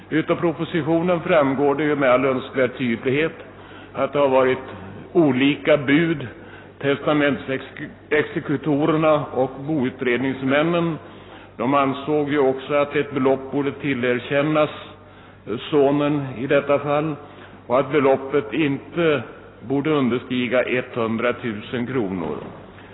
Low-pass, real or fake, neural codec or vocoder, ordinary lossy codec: 7.2 kHz; real; none; AAC, 16 kbps